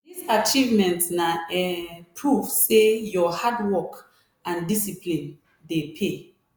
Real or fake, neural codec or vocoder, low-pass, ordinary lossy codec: real; none; none; none